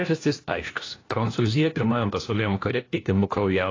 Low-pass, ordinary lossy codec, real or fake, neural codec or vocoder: 7.2 kHz; AAC, 32 kbps; fake; codec, 16 kHz, 1 kbps, FunCodec, trained on LibriTTS, 50 frames a second